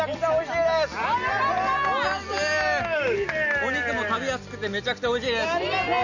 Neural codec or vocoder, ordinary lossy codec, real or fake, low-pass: none; none; real; 7.2 kHz